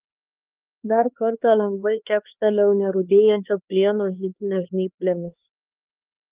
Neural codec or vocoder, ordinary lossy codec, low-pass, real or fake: codec, 16 kHz, 2 kbps, X-Codec, HuBERT features, trained on LibriSpeech; Opus, 32 kbps; 3.6 kHz; fake